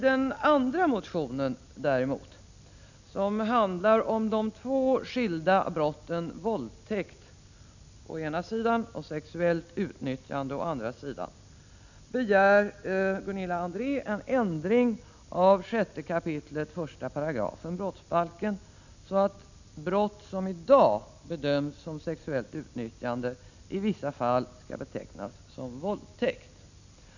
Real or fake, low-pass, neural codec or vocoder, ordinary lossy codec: real; 7.2 kHz; none; none